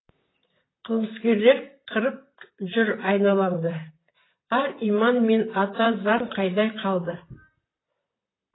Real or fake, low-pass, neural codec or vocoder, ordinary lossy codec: fake; 7.2 kHz; vocoder, 44.1 kHz, 128 mel bands, Pupu-Vocoder; AAC, 16 kbps